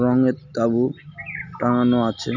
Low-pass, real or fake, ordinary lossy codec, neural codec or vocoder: 7.2 kHz; real; none; none